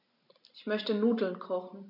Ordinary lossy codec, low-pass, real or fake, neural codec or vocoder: none; 5.4 kHz; real; none